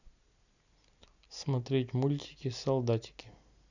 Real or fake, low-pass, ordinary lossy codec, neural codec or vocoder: real; 7.2 kHz; none; none